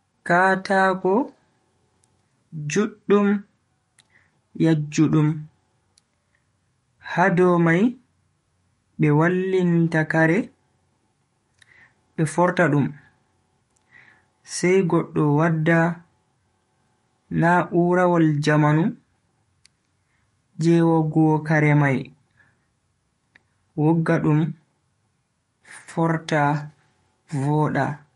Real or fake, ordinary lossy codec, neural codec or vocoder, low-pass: fake; MP3, 48 kbps; codec, 44.1 kHz, 7.8 kbps, DAC; 19.8 kHz